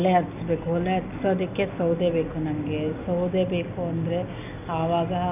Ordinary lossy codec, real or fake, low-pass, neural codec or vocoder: none; real; 3.6 kHz; none